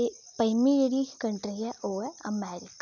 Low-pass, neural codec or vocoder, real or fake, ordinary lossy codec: none; none; real; none